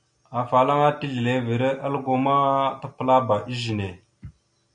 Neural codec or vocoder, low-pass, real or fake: none; 9.9 kHz; real